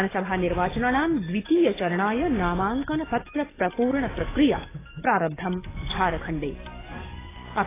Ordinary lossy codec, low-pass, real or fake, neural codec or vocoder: AAC, 16 kbps; 3.6 kHz; fake; codec, 44.1 kHz, 7.8 kbps, DAC